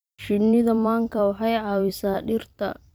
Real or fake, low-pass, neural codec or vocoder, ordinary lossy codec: fake; none; vocoder, 44.1 kHz, 128 mel bands every 256 samples, BigVGAN v2; none